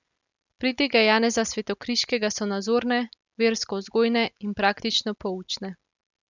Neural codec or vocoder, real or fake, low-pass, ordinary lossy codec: none; real; 7.2 kHz; none